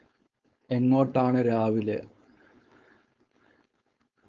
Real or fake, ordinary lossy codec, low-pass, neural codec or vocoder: fake; Opus, 24 kbps; 7.2 kHz; codec, 16 kHz, 4.8 kbps, FACodec